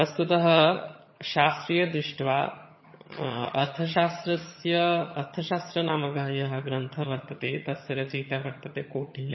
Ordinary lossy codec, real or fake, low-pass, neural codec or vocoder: MP3, 24 kbps; fake; 7.2 kHz; vocoder, 22.05 kHz, 80 mel bands, HiFi-GAN